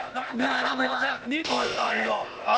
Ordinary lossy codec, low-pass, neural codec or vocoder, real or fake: none; none; codec, 16 kHz, 0.8 kbps, ZipCodec; fake